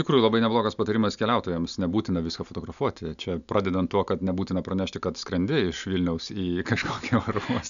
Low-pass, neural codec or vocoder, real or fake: 7.2 kHz; none; real